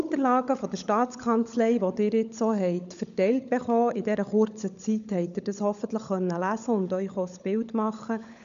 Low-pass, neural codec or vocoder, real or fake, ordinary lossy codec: 7.2 kHz; codec, 16 kHz, 16 kbps, FunCodec, trained on LibriTTS, 50 frames a second; fake; none